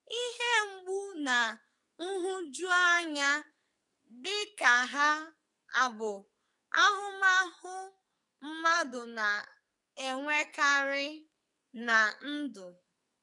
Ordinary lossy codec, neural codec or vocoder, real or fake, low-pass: none; codec, 44.1 kHz, 2.6 kbps, SNAC; fake; 10.8 kHz